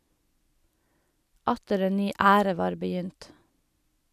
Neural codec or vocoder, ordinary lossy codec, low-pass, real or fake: none; none; 14.4 kHz; real